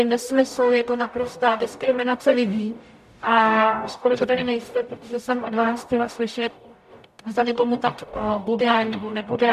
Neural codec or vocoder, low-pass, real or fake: codec, 44.1 kHz, 0.9 kbps, DAC; 14.4 kHz; fake